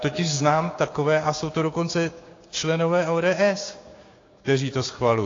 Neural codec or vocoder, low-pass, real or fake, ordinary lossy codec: codec, 16 kHz, 6 kbps, DAC; 7.2 kHz; fake; AAC, 32 kbps